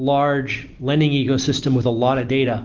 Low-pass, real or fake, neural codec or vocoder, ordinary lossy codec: 7.2 kHz; real; none; Opus, 16 kbps